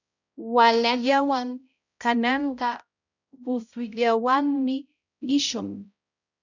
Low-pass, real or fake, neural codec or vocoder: 7.2 kHz; fake; codec, 16 kHz, 0.5 kbps, X-Codec, HuBERT features, trained on balanced general audio